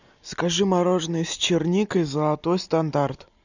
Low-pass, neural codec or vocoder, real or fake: 7.2 kHz; none; real